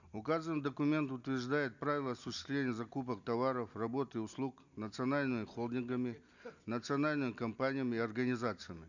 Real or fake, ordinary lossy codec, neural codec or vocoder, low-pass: real; none; none; 7.2 kHz